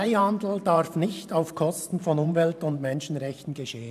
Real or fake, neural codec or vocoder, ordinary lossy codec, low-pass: fake; vocoder, 44.1 kHz, 128 mel bands every 512 samples, BigVGAN v2; AAC, 96 kbps; 14.4 kHz